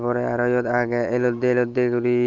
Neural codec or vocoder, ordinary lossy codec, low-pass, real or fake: none; Opus, 24 kbps; 7.2 kHz; real